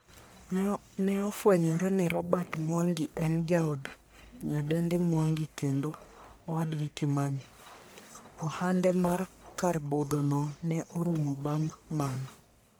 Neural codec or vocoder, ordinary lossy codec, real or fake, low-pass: codec, 44.1 kHz, 1.7 kbps, Pupu-Codec; none; fake; none